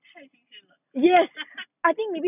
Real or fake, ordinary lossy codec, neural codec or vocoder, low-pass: real; none; none; 3.6 kHz